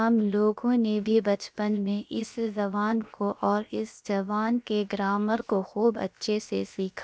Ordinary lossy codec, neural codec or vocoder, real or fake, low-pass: none; codec, 16 kHz, about 1 kbps, DyCAST, with the encoder's durations; fake; none